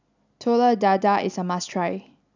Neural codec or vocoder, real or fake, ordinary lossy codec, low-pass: none; real; none; 7.2 kHz